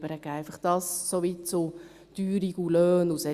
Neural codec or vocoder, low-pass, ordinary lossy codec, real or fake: none; 14.4 kHz; Opus, 64 kbps; real